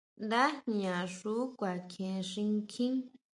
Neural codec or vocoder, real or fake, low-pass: none; real; 10.8 kHz